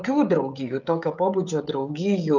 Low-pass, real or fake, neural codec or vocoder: 7.2 kHz; fake; codec, 16 kHz, 16 kbps, FreqCodec, smaller model